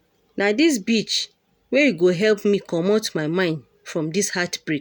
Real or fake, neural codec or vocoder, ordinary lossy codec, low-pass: real; none; none; none